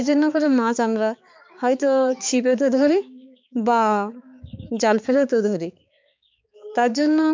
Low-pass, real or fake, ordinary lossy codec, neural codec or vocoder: 7.2 kHz; fake; MP3, 64 kbps; codec, 16 kHz, 4 kbps, X-Codec, HuBERT features, trained on balanced general audio